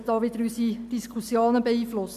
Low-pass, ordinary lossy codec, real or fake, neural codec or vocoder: 14.4 kHz; none; real; none